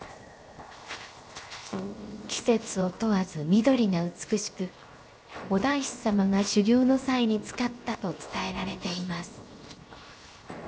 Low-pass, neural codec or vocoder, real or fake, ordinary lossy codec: none; codec, 16 kHz, 0.7 kbps, FocalCodec; fake; none